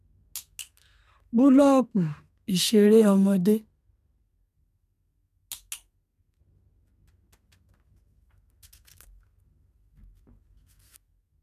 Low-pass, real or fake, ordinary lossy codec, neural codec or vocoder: 14.4 kHz; fake; none; codec, 32 kHz, 1.9 kbps, SNAC